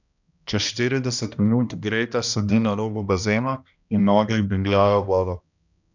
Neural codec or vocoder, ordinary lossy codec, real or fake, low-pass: codec, 16 kHz, 1 kbps, X-Codec, HuBERT features, trained on balanced general audio; none; fake; 7.2 kHz